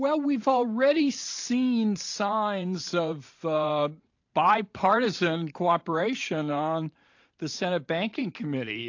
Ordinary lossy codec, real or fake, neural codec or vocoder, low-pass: AAC, 48 kbps; fake; vocoder, 44.1 kHz, 128 mel bands every 512 samples, BigVGAN v2; 7.2 kHz